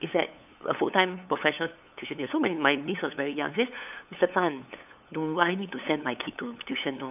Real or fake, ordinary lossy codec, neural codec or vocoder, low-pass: fake; none; codec, 16 kHz, 8 kbps, FunCodec, trained on LibriTTS, 25 frames a second; 3.6 kHz